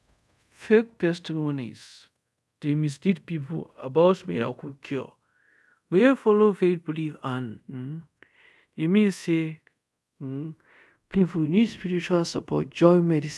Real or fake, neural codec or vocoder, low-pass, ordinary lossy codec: fake; codec, 24 kHz, 0.5 kbps, DualCodec; none; none